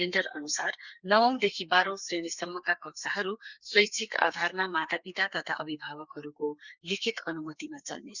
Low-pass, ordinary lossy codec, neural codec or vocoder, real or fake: 7.2 kHz; Opus, 64 kbps; codec, 32 kHz, 1.9 kbps, SNAC; fake